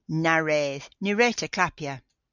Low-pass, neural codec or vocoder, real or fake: 7.2 kHz; none; real